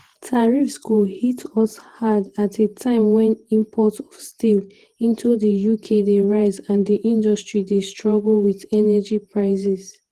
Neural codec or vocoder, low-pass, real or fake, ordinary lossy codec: vocoder, 48 kHz, 128 mel bands, Vocos; 14.4 kHz; fake; Opus, 16 kbps